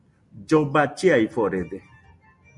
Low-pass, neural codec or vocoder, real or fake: 10.8 kHz; none; real